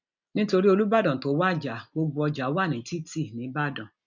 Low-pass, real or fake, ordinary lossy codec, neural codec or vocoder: 7.2 kHz; real; none; none